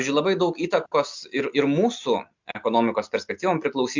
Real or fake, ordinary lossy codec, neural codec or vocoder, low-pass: real; MP3, 64 kbps; none; 7.2 kHz